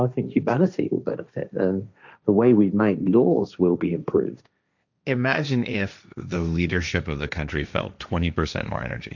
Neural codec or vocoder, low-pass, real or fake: codec, 16 kHz, 1.1 kbps, Voila-Tokenizer; 7.2 kHz; fake